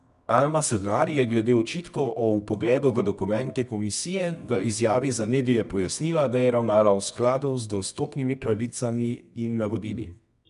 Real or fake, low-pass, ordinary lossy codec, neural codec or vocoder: fake; 10.8 kHz; none; codec, 24 kHz, 0.9 kbps, WavTokenizer, medium music audio release